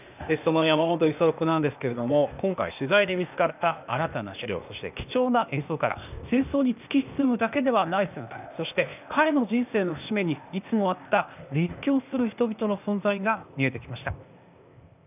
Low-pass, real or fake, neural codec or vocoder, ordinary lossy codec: 3.6 kHz; fake; codec, 16 kHz, 0.8 kbps, ZipCodec; none